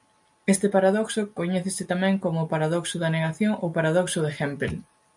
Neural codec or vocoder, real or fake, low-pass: none; real; 10.8 kHz